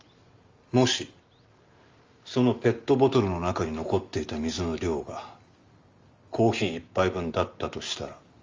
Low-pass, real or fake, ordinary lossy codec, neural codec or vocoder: 7.2 kHz; real; Opus, 32 kbps; none